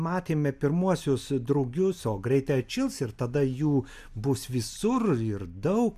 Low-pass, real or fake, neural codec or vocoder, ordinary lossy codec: 14.4 kHz; real; none; MP3, 96 kbps